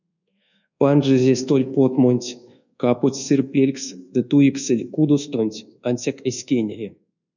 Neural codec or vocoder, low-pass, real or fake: codec, 24 kHz, 1.2 kbps, DualCodec; 7.2 kHz; fake